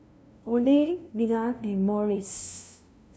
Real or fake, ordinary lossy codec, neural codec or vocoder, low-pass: fake; none; codec, 16 kHz, 0.5 kbps, FunCodec, trained on LibriTTS, 25 frames a second; none